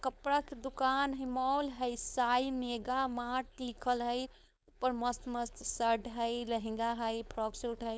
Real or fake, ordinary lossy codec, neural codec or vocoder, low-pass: fake; none; codec, 16 kHz, 4.8 kbps, FACodec; none